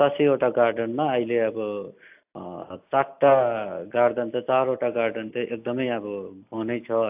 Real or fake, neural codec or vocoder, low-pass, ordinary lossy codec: real; none; 3.6 kHz; AAC, 32 kbps